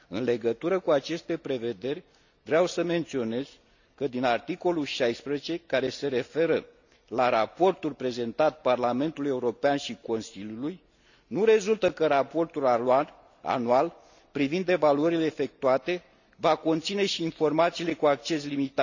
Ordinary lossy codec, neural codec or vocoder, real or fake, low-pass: none; none; real; 7.2 kHz